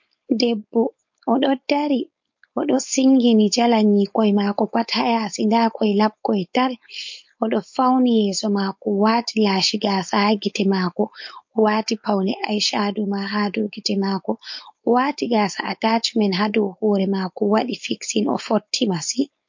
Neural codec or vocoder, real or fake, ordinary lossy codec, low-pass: codec, 16 kHz, 4.8 kbps, FACodec; fake; MP3, 48 kbps; 7.2 kHz